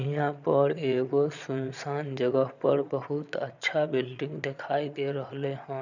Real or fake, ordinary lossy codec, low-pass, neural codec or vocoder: fake; none; 7.2 kHz; vocoder, 22.05 kHz, 80 mel bands, Vocos